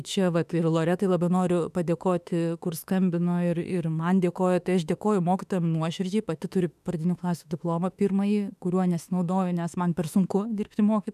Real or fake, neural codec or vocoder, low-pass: fake; autoencoder, 48 kHz, 32 numbers a frame, DAC-VAE, trained on Japanese speech; 14.4 kHz